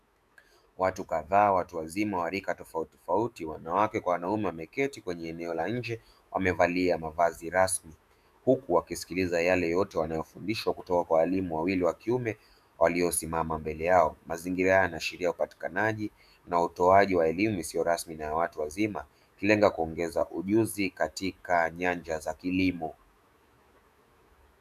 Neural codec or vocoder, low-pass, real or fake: autoencoder, 48 kHz, 128 numbers a frame, DAC-VAE, trained on Japanese speech; 14.4 kHz; fake